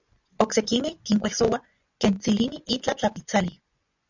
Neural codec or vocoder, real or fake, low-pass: vocoder, 24 kHz, 100 mel bands, Vocos; fake; 7.2 kHz